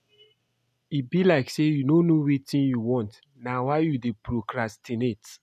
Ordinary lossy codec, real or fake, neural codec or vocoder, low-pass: none; real; none; 14.4 kHz